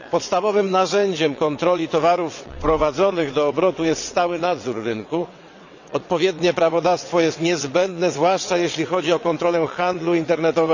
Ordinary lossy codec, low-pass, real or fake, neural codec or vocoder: none; 7.2 kHz; fake; vocoder, 22.05 kHz, 80 mel bands, WaveNeXt